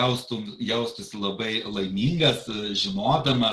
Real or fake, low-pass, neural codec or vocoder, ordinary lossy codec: real; 10.8 kHz; none; Opus, 16 kbps